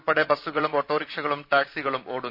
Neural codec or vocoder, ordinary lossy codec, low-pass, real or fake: vocoder, 44.1 kHz, 128 mel bands every 512 samples, BigVGAN v2; none; 5.4 kHz; fake